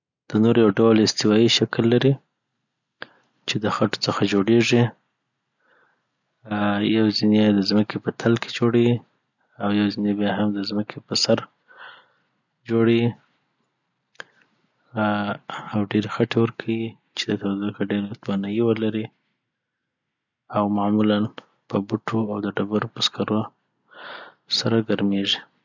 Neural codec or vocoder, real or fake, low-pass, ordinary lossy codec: none; real; 7.2 kHz; none